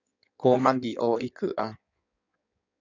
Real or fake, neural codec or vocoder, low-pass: fake; codec, 16 kHz in and 24 kHz out, 1.1 kbps, FireRedTTS-2 codec; 7.2 kHz